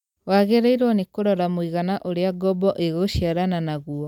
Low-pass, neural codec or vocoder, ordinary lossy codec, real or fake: 19.8 kHz; none; none; real